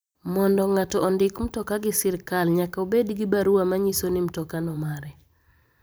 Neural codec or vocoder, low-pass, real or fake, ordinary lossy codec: vocoder, 44.1 kHz, 128 mel bands every 512 samples, BigVGAN v2; none; fake; none